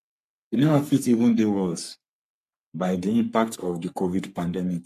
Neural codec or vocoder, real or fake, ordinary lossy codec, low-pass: codec, 44.1 kHz, 3.4 kbps, Pupu-Codec; fake; MP3, 96 kbps; 14.4 kHz